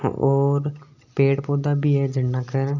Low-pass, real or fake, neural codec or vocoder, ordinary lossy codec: 7.2 kHz; real; none; none